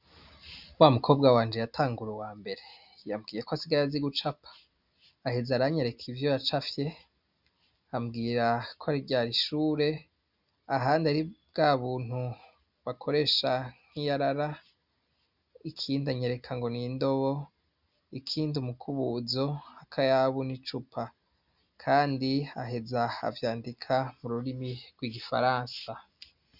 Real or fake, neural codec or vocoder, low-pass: real; none; 5.4 kHz